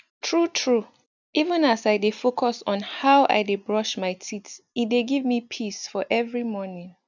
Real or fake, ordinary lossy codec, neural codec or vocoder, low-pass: real; none; none; 7.2 kHz